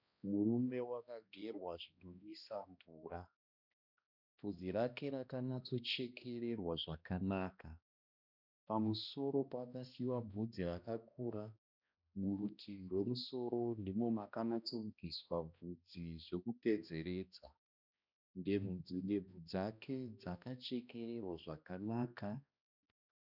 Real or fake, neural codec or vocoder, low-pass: fake; codec, 16 kHz, 1 kbps, X-Codec, HuBERT features, trained on balanced general audio; 5.4 kHz